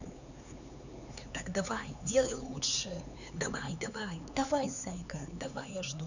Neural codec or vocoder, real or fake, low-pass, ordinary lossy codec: codec, 16 kHz, 4 kbps, X-Codec, HuBERT features, trained on LibriSpeech; fake; 7.2 kHz; none